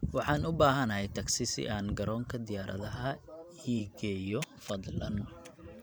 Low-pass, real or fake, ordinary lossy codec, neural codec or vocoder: none; fake; none; vocoder, 44.1 kHz, 128 mel bands every 512 samples, BigVGAN v2